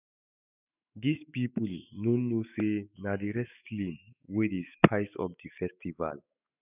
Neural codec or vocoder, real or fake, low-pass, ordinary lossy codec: autoencoder, 48 kHz, 128 numbers a frame, DAC-VAE, trained on Japanese speech; fake; 3.6 kHz; none